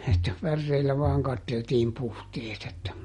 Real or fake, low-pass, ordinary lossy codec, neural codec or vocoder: real; 19.8 kHz; MP3, 48 kbps; none